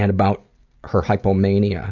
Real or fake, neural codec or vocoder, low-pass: real; none; 7.2 kHz